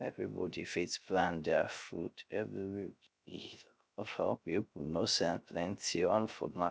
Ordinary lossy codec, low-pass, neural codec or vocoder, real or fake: none; none; codec, 16 kHz, 0.3 kbps, FocalCodec; fake